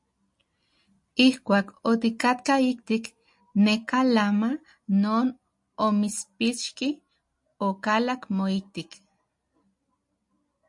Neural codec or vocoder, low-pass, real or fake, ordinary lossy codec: none; 10.8 kHz; real; MP3, 48 kbps